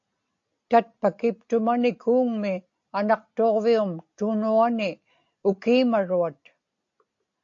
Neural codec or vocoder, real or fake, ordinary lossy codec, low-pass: none; real; AAC, 48 kbps; 7.2 kHz